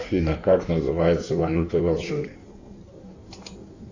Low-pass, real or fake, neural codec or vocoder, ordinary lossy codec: 7.2 kHz; fake; codec, 16 kHz in and 24 kHz out, 1.1 kbps, FireRedTTS-2 codec; AAC, 48 kbps